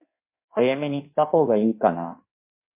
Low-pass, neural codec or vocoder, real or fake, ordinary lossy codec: 3.6 kHz; codec, 16 kHz in and 24 kHz out, 1.1 kbps, FireRedTTS-2 codec; fake; MP3, 24 kbps